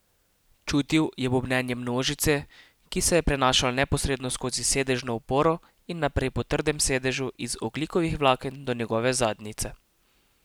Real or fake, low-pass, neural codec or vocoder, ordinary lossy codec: real; none; none; none